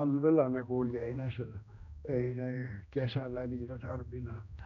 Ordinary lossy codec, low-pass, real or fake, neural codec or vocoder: none; 7.2 kHz; fake; codec, 16 kHz, 1 kbps, X-Codec, HuBERT features, trained on general audio